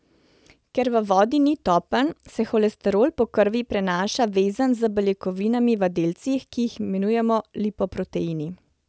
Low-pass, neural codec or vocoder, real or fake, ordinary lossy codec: none; none; real; none